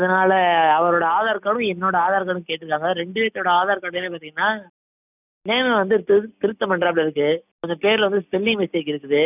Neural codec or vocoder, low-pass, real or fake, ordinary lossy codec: none; 3.6 kHz; real; none